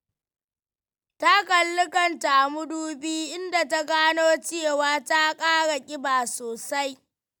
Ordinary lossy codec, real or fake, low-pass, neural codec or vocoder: none; real; 19.8 kHz; none